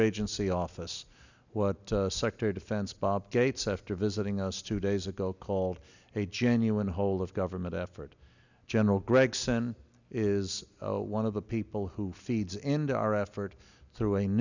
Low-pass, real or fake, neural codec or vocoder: 7.2 kHz; real; none